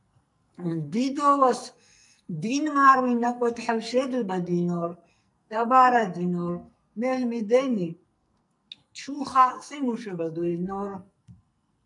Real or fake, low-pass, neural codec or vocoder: fake; 10.8 kHz; codec, 44.1 kHz, 2.6 kbps, SNAC